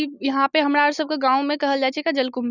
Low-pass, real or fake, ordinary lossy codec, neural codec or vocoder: 7.2 kHz; real; none; none